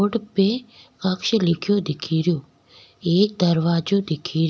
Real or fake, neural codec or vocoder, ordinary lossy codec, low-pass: real; none; none; none